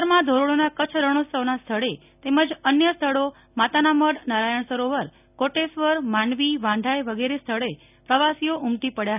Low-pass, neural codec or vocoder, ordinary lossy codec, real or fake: 3.6 kHz; none; none; real